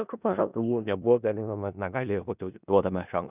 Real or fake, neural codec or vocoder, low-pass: fake; codec, 16 kHz in and 24 kHz out, 0.4 kbps, LongCat-Audio-Codec, four codebook decoder; 3.6 kHz